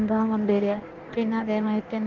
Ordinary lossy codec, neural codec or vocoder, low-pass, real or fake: Opus, 16 kbps; codec, 16 kHz in and 24 kHz out, 1.1 kbps, FireRedTTS-2 codec; 7.2 kHz; fake